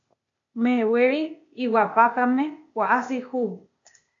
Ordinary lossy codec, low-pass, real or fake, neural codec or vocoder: AAC, 48 kbps; 7.2 kHz; fake; codec, 16 kHz, 0.8 kbps, ZipCodec